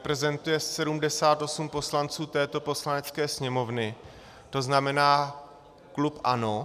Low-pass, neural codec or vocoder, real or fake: 14.4 kHz; none; real